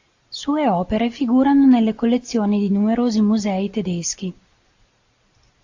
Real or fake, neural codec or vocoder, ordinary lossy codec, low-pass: real; none; AAC, 48 kbps; 7.2 kHz